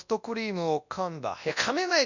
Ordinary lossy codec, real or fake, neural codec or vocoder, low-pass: none; fake; codec, 24 kHz, 0.9 kbps, WavTokenizer, large speech release; 7.2 kHz